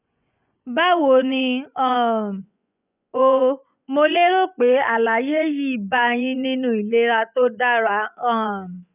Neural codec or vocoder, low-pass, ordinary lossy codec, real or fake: vocoder, 24 kHz, 100 mel bands, Vocos; 3.6 kHz; none; fake